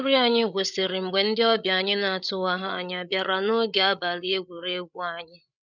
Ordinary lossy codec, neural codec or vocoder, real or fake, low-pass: none; codec, 16 kHz, 8 kbps, FreqCodec, larger model; fake; 7.2 kHz